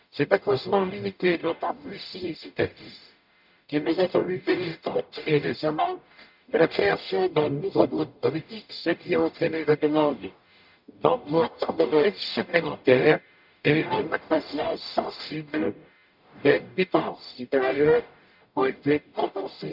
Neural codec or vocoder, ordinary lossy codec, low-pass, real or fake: codec, 44.1 kHz, 0.9 kbps, DAC; none; 5.4 kHz; fake